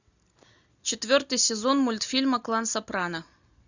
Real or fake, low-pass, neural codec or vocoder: real; 7.2 kHz; none